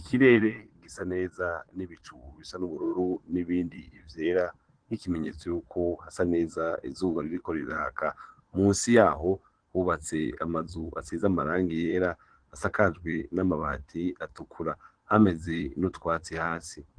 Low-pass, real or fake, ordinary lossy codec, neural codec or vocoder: 9.9 kHz; fake; Opus, 16 kbps; vocoder, 22.05 kHz, 80 mel bands, Vocos